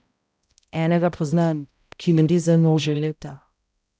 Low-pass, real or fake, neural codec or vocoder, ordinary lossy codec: none; fake; codec, 16 kHz, 0.5 kbps, X-Codec, HuBERT features, trained on balanced general audio; none